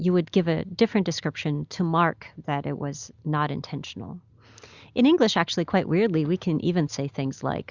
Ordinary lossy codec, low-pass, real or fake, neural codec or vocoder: Opus, 64 kbps; 7.2 kHz; real; none